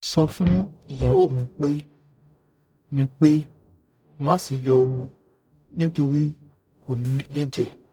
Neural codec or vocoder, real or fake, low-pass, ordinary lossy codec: codec, 44.1 kHz, 0.9 kbps, DAC; fake; 19.8 kHz; none